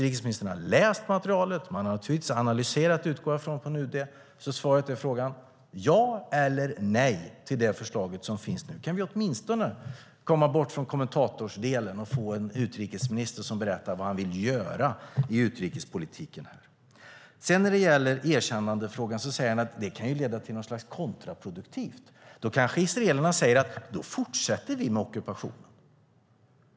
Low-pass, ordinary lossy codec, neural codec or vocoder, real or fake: none; none; none; real